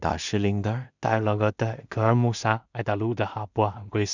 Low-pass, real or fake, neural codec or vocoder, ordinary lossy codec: 7.2 kHz; fake; codec, 16 kHz in and 24 kHz out, 0.4 kbps, LongCat-Audio-Codec, two codebook decoder; none